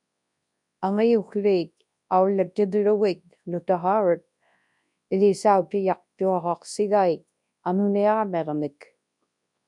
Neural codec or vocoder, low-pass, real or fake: codec, 24 kHz, 0.9 kbps, WavTokenizer, large speech release; 10.8 kHz; fake